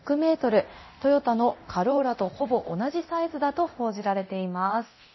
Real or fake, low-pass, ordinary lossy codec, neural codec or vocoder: fake; 7.2 kHz; MP3, 24 kbps; codec, 24 kHz, 0.9 kbps, DualCodec